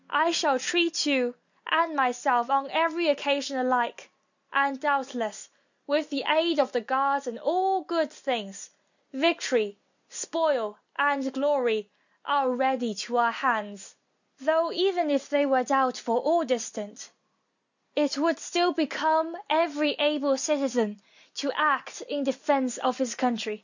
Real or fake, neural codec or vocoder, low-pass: real; none; 7.2 kHz